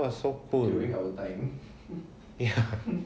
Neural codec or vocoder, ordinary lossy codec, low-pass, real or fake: none; none; none; real